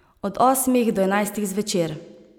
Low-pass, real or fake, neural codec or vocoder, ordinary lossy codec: none; real; none; none